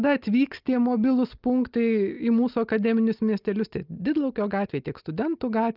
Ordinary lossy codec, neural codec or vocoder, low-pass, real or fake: Opus, 24 kbps; none; 5.4 kHz; real